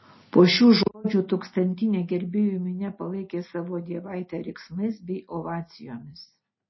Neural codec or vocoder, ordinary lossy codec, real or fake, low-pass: none; MP3, 24 kbps; real; 7.2 kHz